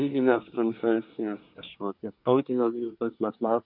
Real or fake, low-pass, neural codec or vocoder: fake; 5.4 kHz; codec, 24 kHz, 1 kbps, SNAC